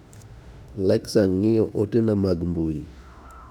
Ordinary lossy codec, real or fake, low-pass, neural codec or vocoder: none; fake; 19.8 kHz; autoencoder, 48 kHz, 32 numbers a frame, DAC-VAE, trained on Japanese speech